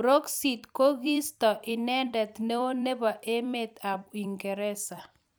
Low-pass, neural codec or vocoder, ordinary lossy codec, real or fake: none; vocoder, 44.1 kHz, 128 mel bands every 256 samples, BigVGAN v2; none; fake